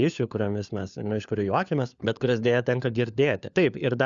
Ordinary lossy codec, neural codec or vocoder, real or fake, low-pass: Opus, 64 kbps; codec, 16 kHz, 4 kbps, FunCodec, trained on LibriTTS, 50 frames a second; fake; 7.2 kHz